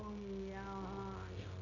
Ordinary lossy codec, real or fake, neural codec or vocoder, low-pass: none; fake; codec, 16 kHz, 0.9 kbps, LongCat-Audio-Codec; 7.2 kHz